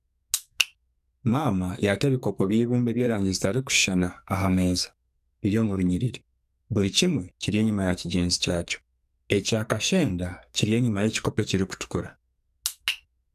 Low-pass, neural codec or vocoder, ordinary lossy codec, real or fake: 14.4 kHz; codec, 44.1 kHz, 2.6 kbps, SNAC; none; fake